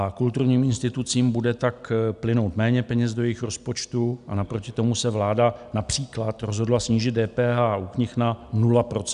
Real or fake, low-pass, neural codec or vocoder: real; 10.8 kHz; none